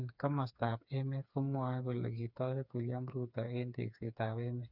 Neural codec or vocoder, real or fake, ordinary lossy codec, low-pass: codec, 16 kHz, 4 kbps, FreqCodec, smaller model; fake; none; 5.4 kHz